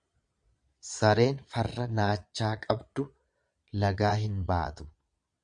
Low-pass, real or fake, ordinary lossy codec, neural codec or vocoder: 9.9 kHz; fake; MP3, 96 kbps; vocoder, 22.05 kHz, 80 mel bands, Vocos